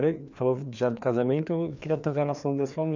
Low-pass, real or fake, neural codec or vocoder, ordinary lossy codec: 7.2 kHz; fake; codec, 16 kHz, 2 kbps, FreqCodec, larger model; none